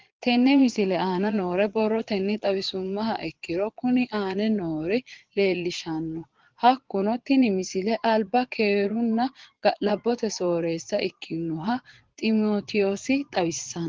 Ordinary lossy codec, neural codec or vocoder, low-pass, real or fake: Opus, 16 kbps; vocoder, 22.05 kHz, 80 mel bands, Vocos; 7.2 kHz; fake